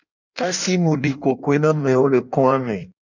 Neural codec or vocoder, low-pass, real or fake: codec, 16 kHz in and 24 kHz out, 1.1 kbps, FireRedTTS-2 codec; 7.2 kHz; fake